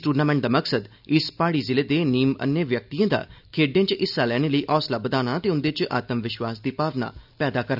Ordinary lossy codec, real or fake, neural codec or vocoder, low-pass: none; real; none; 5.4 kHz